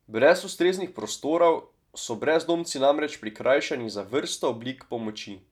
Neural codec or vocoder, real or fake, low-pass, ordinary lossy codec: none; real; 19.8 kHz; none